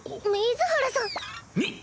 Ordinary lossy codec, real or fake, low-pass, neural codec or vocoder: none; real; none; none